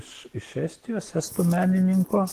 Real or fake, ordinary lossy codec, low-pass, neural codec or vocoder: real; Opus, 16 kbps; 14.4 kHz; none